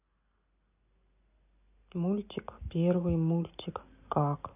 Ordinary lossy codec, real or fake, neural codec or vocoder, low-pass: AAC, 32 kbps; real; none; 3.6 kHz